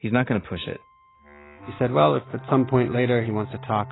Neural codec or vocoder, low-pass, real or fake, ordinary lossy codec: none; 7.2 kHz; real; AAC, 16 kbps